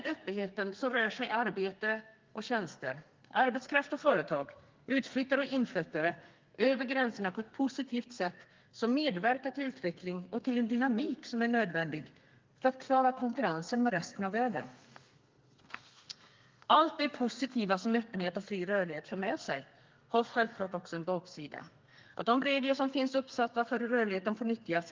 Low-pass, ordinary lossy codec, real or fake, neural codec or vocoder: 7.2 kHz; Opus, 32 kbps; fake; codec, 32 kHz, 1.9 kbps, SNAC